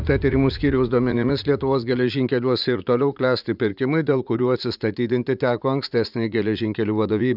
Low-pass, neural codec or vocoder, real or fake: 5.4 kHz; vocoder, 44.1 kHz, 80 mel bands, Vocos; fake